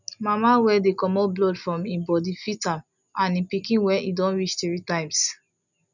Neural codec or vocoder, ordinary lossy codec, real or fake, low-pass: none; none; real; 7.2 kHz